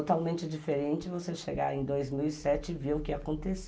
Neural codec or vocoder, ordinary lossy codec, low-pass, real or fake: none; none; none; real